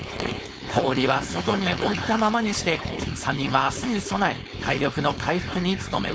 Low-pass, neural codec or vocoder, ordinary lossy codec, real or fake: none; codec, 16 kHz, 4.8 kbps, FACodec; none; fake